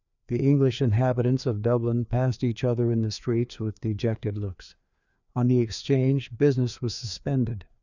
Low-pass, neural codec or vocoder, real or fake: 7.2 kHz; codec, 16 kHz, 2 kbps, FreqCodec, larger model; fake